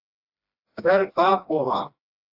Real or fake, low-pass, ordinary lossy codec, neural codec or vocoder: fake; 5.4 kHz; AAC, 48 kbps; codec, 16 kHz, 1 kbps, FreqCodec, smaller model